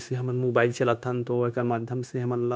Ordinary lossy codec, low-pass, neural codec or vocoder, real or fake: none; none; codec, 16 kHz, 0.9 kbps, LongCat-Audio-Codec; fake